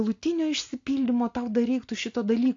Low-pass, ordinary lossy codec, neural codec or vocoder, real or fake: 7.2 kHz; AAC, 48 kbps; none; real